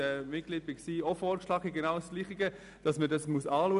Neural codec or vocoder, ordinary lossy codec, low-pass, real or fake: none; none; 10.8 kHz; real